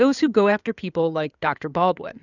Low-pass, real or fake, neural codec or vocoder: 7.2 kHz; fake; codec, 16 kHz in and 24 kHz out, 2.2 kbps, FireRedTTS-2 codec